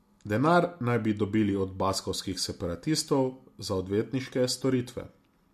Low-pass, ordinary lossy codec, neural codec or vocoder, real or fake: 14.4 kHz; MP3, 64 kbps; none; real